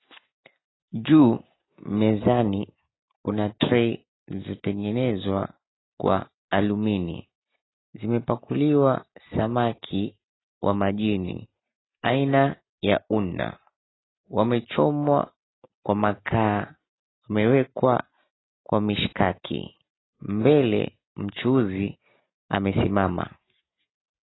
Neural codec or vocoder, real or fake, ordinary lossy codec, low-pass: none; real; AAC, 16 kbps; 7.2 kHz